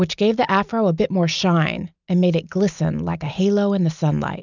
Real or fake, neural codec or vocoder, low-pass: real; none; 7.2 kHz